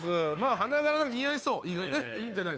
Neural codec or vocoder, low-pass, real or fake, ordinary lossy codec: codec, 16 kHz, 2 kbps, FunCodec, trained on Chinese and English, 25 frames a second; none; fake; none